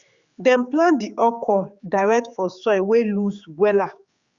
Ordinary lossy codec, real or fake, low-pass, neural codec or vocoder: Opus, 64 kbps; fake; 7.2 kHz; codec, 16 kHz, 4 kbps, X-Codec, HuBERT features, trained on general audio